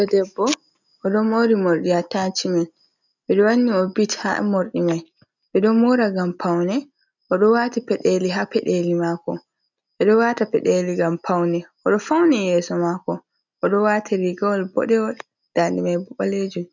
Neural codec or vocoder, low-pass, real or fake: none; 7.2 kHz; real